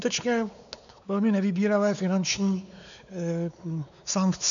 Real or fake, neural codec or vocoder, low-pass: fake; codec, 16 kHz, 4 kbps, X-Codec, WavLM features, trained on Multilingual LibriSpeech; 7.2 kHz